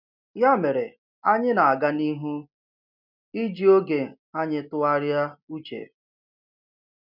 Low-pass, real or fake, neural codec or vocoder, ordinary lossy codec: 5.4 kHz; real; none; MP3, 48 kbps